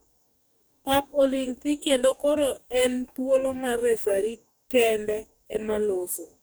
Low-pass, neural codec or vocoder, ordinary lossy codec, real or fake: none; codec, 44.1 kHz, 2.6 kbps, DAC; none; fake